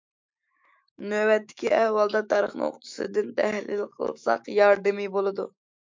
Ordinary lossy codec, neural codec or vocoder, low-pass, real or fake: MP3, 64 kbps; autoencoder, 48 kHz, 128 numbers a frame, DAC-VAE, trained on Japanese speech; 7.2 kHz; fake